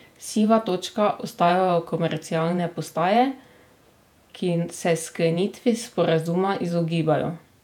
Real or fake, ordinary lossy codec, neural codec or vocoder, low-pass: fake; none; vocoder, 48 kHz, 128 mel bands, Vocos; 19.8 kHz